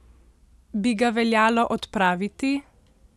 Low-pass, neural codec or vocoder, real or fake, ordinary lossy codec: none; none; real; none